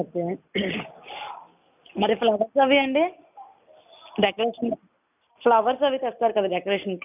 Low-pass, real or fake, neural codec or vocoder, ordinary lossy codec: 3.6 kHz; real; none; none